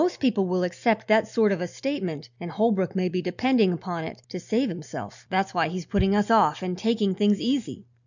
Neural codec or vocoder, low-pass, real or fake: none; 7.2 kHz; real